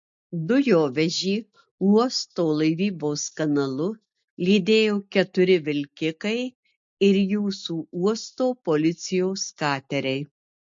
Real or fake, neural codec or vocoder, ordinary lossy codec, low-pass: real; none; MP3, 48 kbps; 7.2 kHz